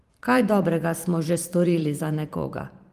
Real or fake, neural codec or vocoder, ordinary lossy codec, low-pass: fake; vocoder, 44.1 kHz, 128 mel bands every 512 samples, BigVGAN v2; Opus, 24 kbps; 14.4 kHz